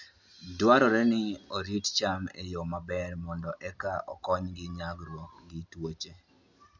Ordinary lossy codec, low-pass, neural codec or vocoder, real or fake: none; 7.2 kHz; none; real